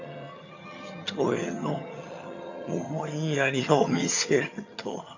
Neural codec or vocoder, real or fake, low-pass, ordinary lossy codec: vocoder, 22.05 kHz, 80 mel bands, HiFi-GAN; fake; 7.2 kHz; none